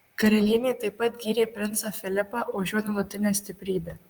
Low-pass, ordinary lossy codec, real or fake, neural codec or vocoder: 19.8 kHz; Opus, 32 kbps; fake; vocoder, 44.1 kHz, 128 mel bands, Pupu-Vocoder